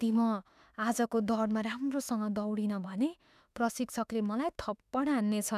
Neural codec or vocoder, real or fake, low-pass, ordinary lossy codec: autoencoder, 48 kHz, 32 numbers a frame, DAC-VAE, trained on Japanese speech; fake; 14.4 kHz; none